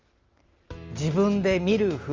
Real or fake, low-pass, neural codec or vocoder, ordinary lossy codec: real; 7.2 kHz; none; Opus, 32 kbps